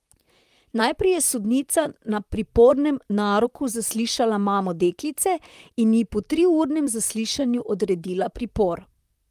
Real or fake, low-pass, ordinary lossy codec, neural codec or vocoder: fake; 14.4 kHz; Opus, 32 kbps; vocoder, 44.1 kHz, 128 mel bands, Pupu-Vocoder